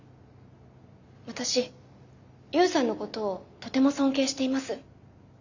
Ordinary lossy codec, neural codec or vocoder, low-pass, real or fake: none; none; 7.2 kHz; real